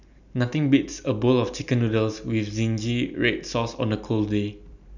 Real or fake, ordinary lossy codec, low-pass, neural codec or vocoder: real; none; 7.2 kHz; none